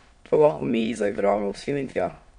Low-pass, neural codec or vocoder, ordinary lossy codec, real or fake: 9.9 kHz; autoencoder, 22.05 kHz, a latent of 192 numbers a frame, VITS, trained on many speakers; none; fake